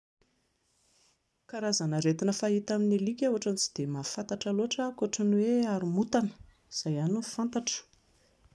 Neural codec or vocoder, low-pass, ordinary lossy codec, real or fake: none; none; none; real